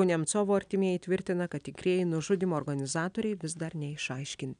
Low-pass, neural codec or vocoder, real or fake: 9.9 kHz; none; real